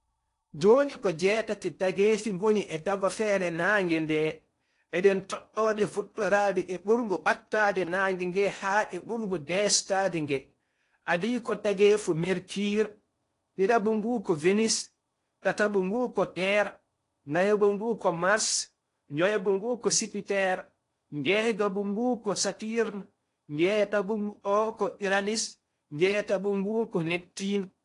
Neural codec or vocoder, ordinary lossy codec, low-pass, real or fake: codec, 16 kHz in and 24 kHz out, 0.8 kbps, FocalCodec, streaming, 65536 codes; AAC, 48 kbps; 10.8 kHz; fake